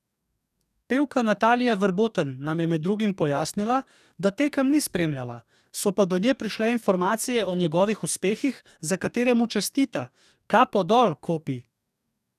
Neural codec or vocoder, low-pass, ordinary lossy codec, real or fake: codec, 44.1 kHz, 2.6 kbps, DAC; 14.4 kHz; none; fake